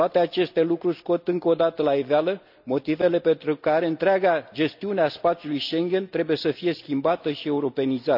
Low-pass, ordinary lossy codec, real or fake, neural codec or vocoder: 5.4 kHz; none; real; none